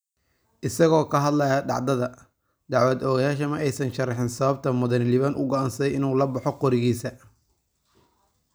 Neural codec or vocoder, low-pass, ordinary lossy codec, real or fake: none; none; none; real